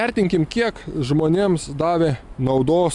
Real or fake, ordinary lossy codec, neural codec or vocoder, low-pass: real; MP3, 96 kbps; none; 10.8 kHz